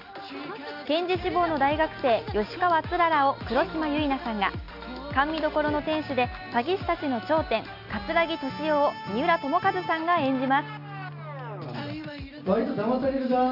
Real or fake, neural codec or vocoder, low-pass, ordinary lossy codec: real; none; 5.4 kHz; none